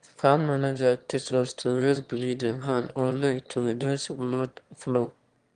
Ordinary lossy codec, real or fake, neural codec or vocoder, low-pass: Opus, 24 kbps; fake; autoencoder, 22.05 kHz, a latent of 192 numbers a frame, VITS, trained on one speaker; 9.9 kHz